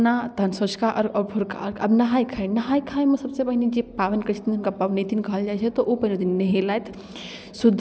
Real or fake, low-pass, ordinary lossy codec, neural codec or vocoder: real; none; none; none